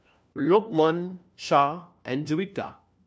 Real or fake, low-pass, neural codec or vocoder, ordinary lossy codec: fake; none; codec, 16 kHz, 1 kbps, FunCodec, trained on LibriTTS, 50 frames a second; none